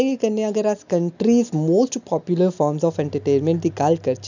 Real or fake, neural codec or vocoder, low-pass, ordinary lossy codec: real; none; 7.2 kHz; none